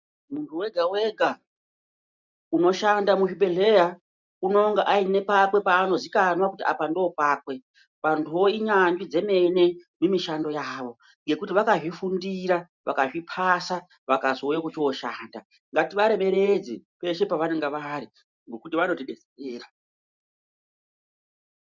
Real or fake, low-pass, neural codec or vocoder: real; 7.2 kHz; none